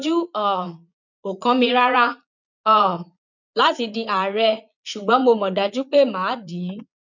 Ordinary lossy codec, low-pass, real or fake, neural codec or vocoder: none; 7.2 kHz; fake; vocoder, 22.05 kHz, 80 mel bands, Vocos